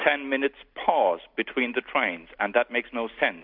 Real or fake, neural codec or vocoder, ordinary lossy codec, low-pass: real; none; MP3, 48 kbps; 5.4 kHz